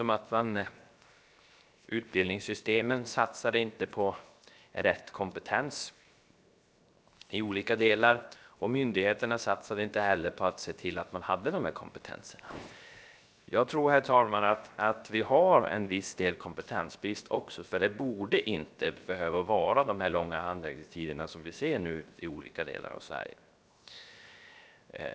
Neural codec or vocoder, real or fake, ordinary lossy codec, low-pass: codec, 16 kHz, 0.7 kbps, FocalCodec; fake; none; none